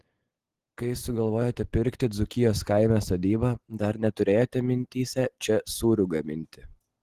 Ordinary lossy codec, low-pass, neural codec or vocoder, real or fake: Opus, 16 kbps; 14.4 kHz; none; real